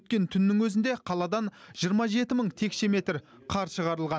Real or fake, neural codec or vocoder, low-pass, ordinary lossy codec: real; none; none; none